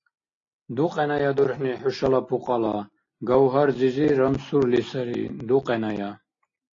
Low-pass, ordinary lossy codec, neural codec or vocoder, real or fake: 7.2 kHz; AAC, 32 kbps; none; real